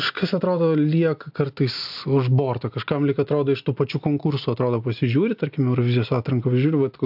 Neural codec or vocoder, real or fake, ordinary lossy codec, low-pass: none; real; AAC, 48 kbps; 5.4 kHz